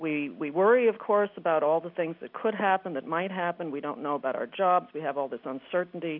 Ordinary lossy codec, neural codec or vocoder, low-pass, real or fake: AAC, 48 kbps; none; 5.4 kHz; real